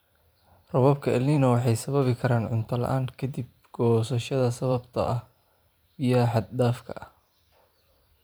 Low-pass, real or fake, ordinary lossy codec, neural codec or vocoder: none; fake; none; vocoder, 44.1 kHz, 128 mel bands every 512 samples, BigVGAN v2